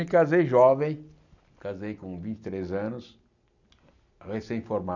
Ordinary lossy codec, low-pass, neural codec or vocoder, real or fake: none; 7.2 kHz; none; real